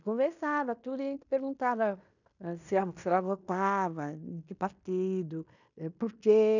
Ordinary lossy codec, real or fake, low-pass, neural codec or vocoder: none; fake; 7.2 kHz; codec, 16 kHz in and 24 kHz out, 0.9 kbps, LongCat-Audio-Codec, fine tuned four codebook decoder